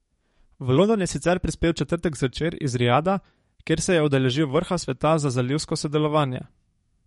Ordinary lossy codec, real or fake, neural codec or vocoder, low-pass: MP3, 48 kbps; fake; codec, 44.1 kHz, 7.8 kbps, DAC; 19.8 kHz